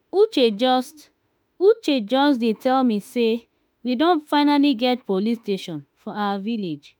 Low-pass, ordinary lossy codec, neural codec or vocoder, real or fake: none; none; autoencoder, 48 kHz, 32 numbers a frame, DAC-VAE, trained on Japanese speech; fake